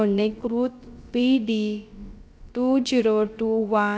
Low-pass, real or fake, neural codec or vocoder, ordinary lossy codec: none; fake; codec, 16 kHz, about 1 kbps, DyCAST, with the encoder's durations; none